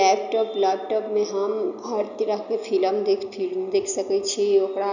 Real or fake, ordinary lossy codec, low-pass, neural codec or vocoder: real; none; 7.2 kHz; none